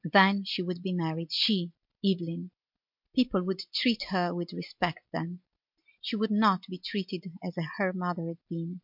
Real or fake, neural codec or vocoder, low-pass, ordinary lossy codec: real; none; 5.4 kHz; MP3, 48 kbps